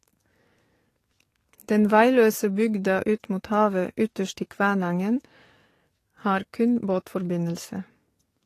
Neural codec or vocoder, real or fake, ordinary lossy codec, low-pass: codec, 44.1 kHz, 7.8 kbps, DAC; fake; AAC, 48 kbps; 14.4 kHz